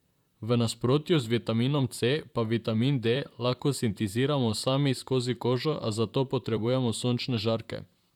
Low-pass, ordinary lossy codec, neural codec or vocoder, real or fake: 19.8 kHz; none; vocoder, 44.1 kHz, 128 mel bands, Pupu-Vocoder; fake